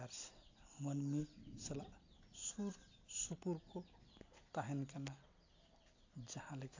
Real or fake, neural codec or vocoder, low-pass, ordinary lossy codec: real; none; 7.2 kHz; AAC, 48 kbps